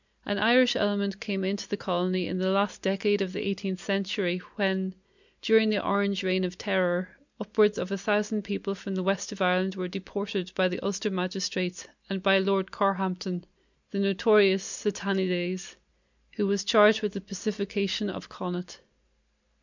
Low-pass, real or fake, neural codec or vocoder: 7.2 kHz; real; none